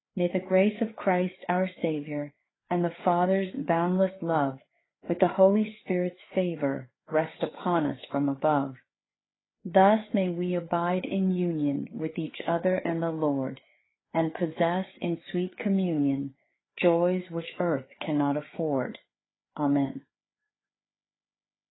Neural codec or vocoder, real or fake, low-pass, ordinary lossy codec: codec, 16 kHz, 4 kbps, FreqCodec, larger model; fake; 7.2 kHz; AAC, 16 kbps